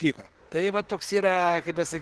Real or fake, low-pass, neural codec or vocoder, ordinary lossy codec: fake; 10.8 kHz; codec, 24 kHz, 1 kbps, SNAC; Opus, 16 kbps